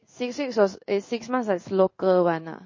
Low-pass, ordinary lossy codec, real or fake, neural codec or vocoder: 7.2 kHz; MP3, 32 kbps; fake; codec, 16 kHz in and 24 kHz out, 1 kbps, XY-Tokenizer